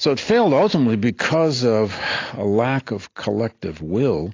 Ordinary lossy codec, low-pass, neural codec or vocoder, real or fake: AAC, 32 kbps; 7.2 kHz; none; real